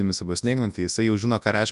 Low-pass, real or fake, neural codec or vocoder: 10.8 kHz; fake; codec, 24 kHz, 0.9 kbps, WavTokenizer, large speech release